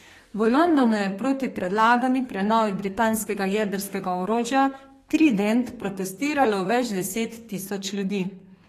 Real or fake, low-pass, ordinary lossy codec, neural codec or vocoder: fake; 14.4 kHz; AAC, 48 kbps; codec, 32 kHz, 1.9 kbps, SNAC